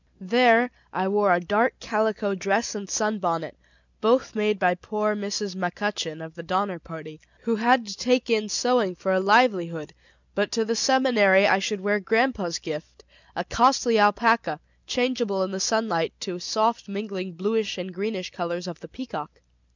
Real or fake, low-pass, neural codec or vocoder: real; 7.2 kHz; none